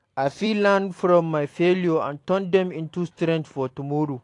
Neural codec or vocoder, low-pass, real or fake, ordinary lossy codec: vocoder, 44.1 kHz, 128 mel bands every 256 samples, BigVGAN v2; 10.8 kHz; fake; AAC, 48 kbps